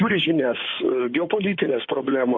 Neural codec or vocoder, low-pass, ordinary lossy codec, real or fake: codec, 16 kHz in and 24 kHz out, 2.2 kbps, FireRedTTS-2 codec; 7.2 kHz; AAC, 48 kbps; fake